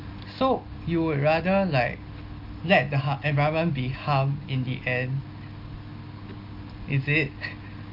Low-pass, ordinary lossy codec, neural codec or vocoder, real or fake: 5.4 kHz; Opus, 24 kbps; none; real